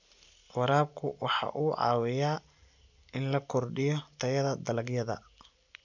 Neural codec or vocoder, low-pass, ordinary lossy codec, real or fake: none; 7.2 kHz; none; real